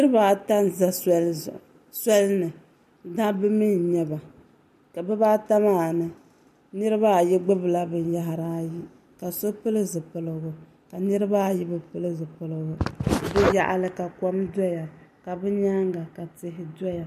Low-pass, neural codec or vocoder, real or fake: 14.4 kHz; none; real